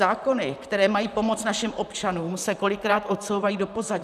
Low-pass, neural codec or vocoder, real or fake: 14.4 kHz; vocoder, 44.1 kHz, 128 mel bands, Pupu-Vocoder; fake